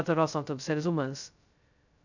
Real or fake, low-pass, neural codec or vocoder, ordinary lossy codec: fake; 7.2 kHz; codec, 16 kHz, 0.2 kbps, FocalCodec; none